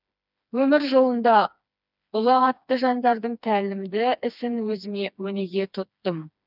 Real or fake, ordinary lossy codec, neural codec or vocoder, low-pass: fake; none; codec, 16 kHz, 2 kbps, FreqCodec, smaller model; 5.4 kHz